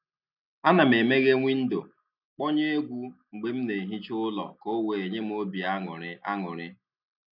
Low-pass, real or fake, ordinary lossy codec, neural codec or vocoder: 5.4 kHz; real; none; none